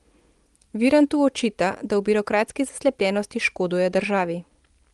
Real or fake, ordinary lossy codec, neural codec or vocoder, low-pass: real; Opus, 24 kbps; none; 10.8 kHz